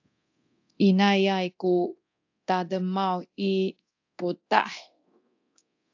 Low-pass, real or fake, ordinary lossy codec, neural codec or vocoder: 7.2 kHz; fake; AAC, 48 kbps; codec, 24 kHz, 0.9 kbps, DualCodec